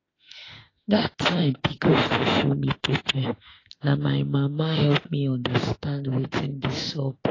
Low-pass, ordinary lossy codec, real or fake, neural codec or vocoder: 7.2 kHz; AAC, 32 kbps; fake; autoencoder, 48 kHz, 32 numbers a frame, DAC-VAE, trained on Japanese speech